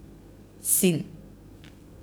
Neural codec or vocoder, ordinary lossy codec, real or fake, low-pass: codec, 44.1 kHz, 7.8 kbps, DAC; none; fake; none